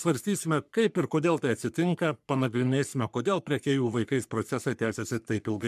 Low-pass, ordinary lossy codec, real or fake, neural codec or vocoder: 14.4 kHz; MP3, 96 kbps; fake; codec, 44.1 kHz, 3.4 kbps, Pupu-Codec